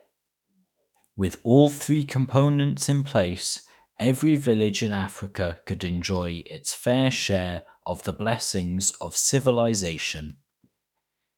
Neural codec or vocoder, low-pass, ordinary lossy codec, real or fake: autoencoder, 48 kHz, 32 numbers a frame, DAC-VAE, trained on Japanese speech; 19.8 kHz; none; fake